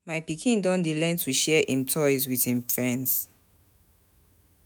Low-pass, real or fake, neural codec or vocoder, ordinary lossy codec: none; fake; autoencoder, 48 kHz, 128 numbers a frame, DAC-VAE, trained on Japanese speech; none